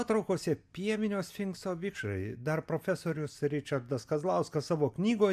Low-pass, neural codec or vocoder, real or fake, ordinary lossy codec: 14.4 kHz; none; real; AAC, 96 kbps